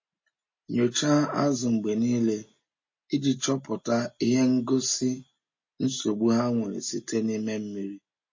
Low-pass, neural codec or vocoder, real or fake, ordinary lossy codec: 7.2 kHz; none; real; MP3, 32 kbps